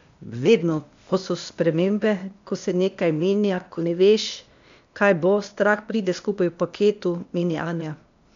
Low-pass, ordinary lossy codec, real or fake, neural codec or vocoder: 7.2 kHz; MP3, 64 kbps; fake; codec, 16 kHz, 0.8 kbps, ZipCodec